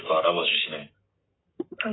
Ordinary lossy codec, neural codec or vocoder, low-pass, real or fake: AAC, 16 kbps; codec, 44.1 kHz, 7.8 kbps, Pupu-Codec; 7.2 kHz; fake